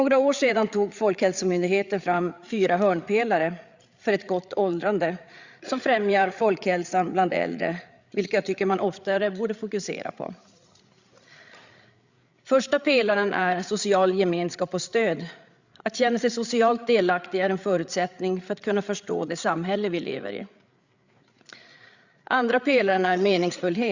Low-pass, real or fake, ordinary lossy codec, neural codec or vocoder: 7.2 kHz; fake; Opus, 64 kbps; codec, 16 kHz, 8 kbps, FreqCodec, larger model